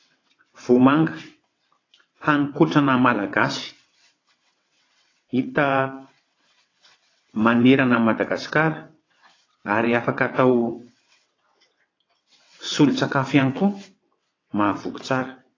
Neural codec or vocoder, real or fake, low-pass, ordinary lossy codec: vocoder, 22.05 kHz, 80 mel bands, WaveNeXt; fake; 7.2 kHz; AAC, 32 kbps